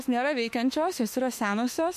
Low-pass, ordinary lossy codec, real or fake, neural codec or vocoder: 14.4 kHz; MP3, 64 kbps; fake; autoencoder, 48 kHz, 32 numbers a frame, DAC-VAE, trained on Japanese speech